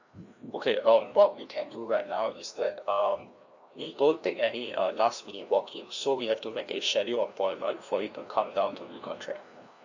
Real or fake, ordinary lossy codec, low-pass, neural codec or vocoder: fake; Opus, 64 kbps; 7.2 kHz; codec, 16 kHz, 1 kbps, FreqCodec, larger model